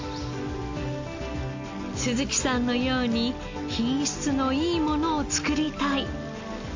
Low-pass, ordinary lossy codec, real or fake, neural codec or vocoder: 7.2 kHz; AAC, 48 kbps; real; none